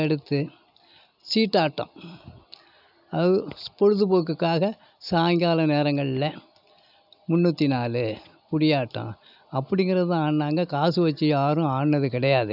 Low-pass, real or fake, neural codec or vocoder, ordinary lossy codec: 5.4 kHz; real; none; none